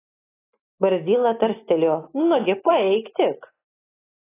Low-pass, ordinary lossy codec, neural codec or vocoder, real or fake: 3.6 kHz; AAC, 16 kbps; none; real